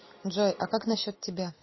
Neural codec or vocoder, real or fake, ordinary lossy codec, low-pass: none; real; MP3, 24 kbps; 7.2 kHz